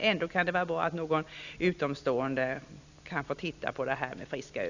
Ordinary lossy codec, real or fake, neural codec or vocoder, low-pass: none; real; none; 7.2 kHz